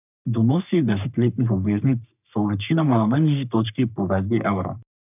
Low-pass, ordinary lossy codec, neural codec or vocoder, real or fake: 3.6 kHz; none; codec, 44.1 kHz, 3.4 kbps, Pupu-Codec; fake